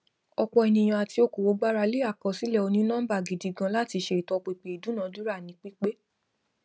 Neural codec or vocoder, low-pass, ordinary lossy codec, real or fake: none; none; none; real